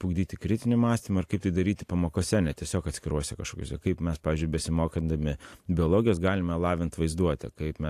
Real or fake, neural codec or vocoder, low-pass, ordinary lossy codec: real; none; 14.4 kHz; AAC, 64 kbps